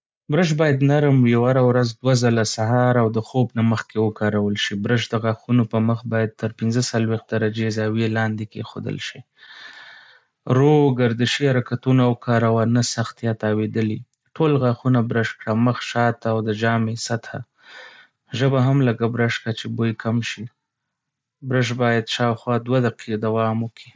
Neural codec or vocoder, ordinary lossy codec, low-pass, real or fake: none; none; none; real